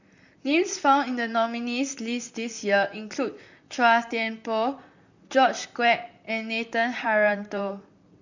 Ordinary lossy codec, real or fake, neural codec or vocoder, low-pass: none; fake; vocoder, 44.1 kHz, 128 mel bands, Pupu-Vocoder; 7.2 kHz